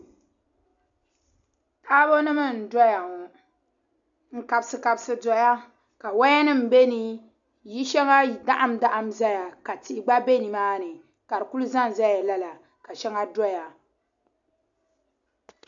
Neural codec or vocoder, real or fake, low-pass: none; real; 7.2 kHz